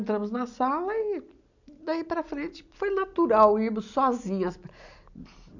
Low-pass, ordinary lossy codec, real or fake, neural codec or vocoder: 7.2 kHz; none; real; none